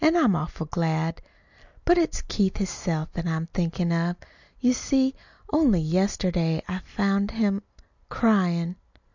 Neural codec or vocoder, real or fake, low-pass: none; real; 7.2 kHz